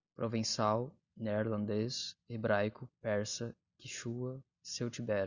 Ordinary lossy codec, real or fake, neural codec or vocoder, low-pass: Opus, 64 kbps; real; none; 7.2 kHz